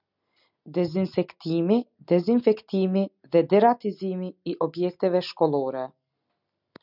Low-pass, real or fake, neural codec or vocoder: 5.4 kHz; real; none